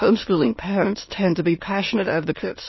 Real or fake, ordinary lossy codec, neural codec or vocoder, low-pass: fake; MP3, 24 kbps; autoencoder, 22.05 kHz, a latent of 192 numbers a frame, VITS, trained on many speakers; 7.2 kHz